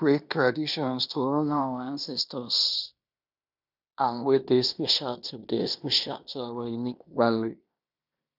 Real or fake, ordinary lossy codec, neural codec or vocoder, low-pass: fake; none; codec, 16 kHz in and 24 kHz out, 0.9 kbps, LongCat-Audio-Codec, fine tuned four codebook decoder; 5.4 kHz